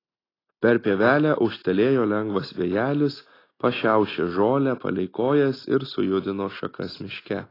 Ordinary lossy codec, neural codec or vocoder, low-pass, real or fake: AAC, 24 kbps; none; 5.4 kHz; real